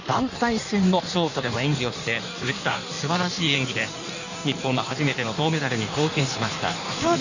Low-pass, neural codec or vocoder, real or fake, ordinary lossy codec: 7.2 kHz; codec, 16 kHz in and 24 kHz out, 1.1 kbps, FireRedTTS-2 codec; fake; none